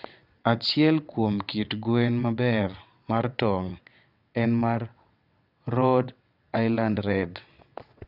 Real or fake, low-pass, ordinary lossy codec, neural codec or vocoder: fake; 5.4 kHz; none; vocoder, 22.05 kHz, 80 mel bands, WaveNeXt